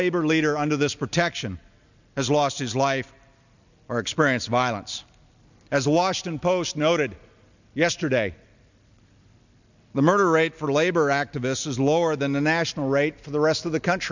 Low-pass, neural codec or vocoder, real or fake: 7.2 kHz; none; real